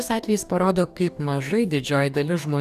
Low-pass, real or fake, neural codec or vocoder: 14.4 kHz; fake; codec, 44.1 kHz, 2.6 kbps, DAC